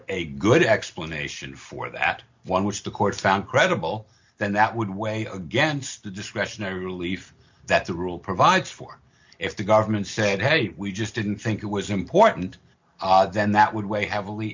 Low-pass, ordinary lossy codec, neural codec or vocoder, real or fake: 7.2 kHz; MP3, 64 kbps; none; real